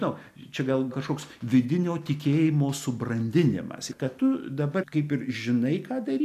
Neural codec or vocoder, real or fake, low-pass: none; real; 14.4 kHz